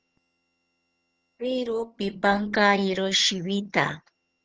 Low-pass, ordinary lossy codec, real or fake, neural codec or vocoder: 7.2 kHz; Opus, 16 kbps; fake; vocoder, 22.05 kHz, 80 mel bands, HiFi-GAN